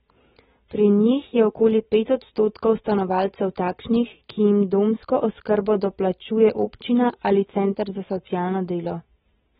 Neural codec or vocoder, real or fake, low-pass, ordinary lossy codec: none; real; 7.2 kHz; AAC, 16 kbps